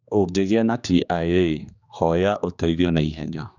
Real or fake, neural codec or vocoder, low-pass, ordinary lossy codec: fake; codec, 16 kHz, 2 kbps, X-Codec, HuBERT features, trained on general audio; 7.2 kHz; none